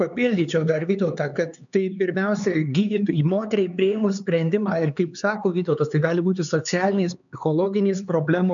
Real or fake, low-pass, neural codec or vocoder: fake; 7.2 kHz; codec, 16 kHz, 4 kbps, X-Codec, HuBERT features, trained on LibriSpeech